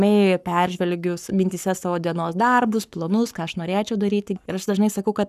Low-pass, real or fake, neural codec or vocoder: 14.4 kHz; fake; codec, 44.1 kHz, 7.8 kbps, Pupu-Codec